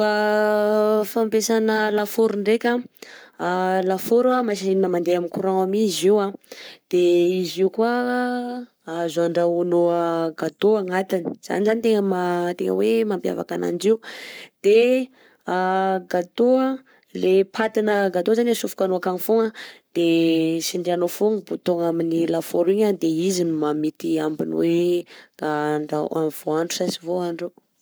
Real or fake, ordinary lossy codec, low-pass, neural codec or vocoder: fake; none; none; codec, 44.1 kHz, 7.8 kbps, Pupu-Codec